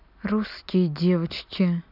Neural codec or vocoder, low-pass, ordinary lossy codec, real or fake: none; 5.4 kHz; none; real